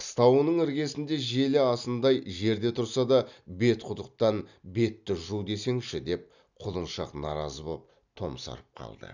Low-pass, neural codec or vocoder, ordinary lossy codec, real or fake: 7.2 kHz; none; none; real